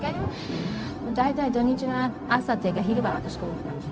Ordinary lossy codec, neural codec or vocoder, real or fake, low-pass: none; codec, 16 kHz, 0.4 kbps, LongCat-Audio-Codec; fake; none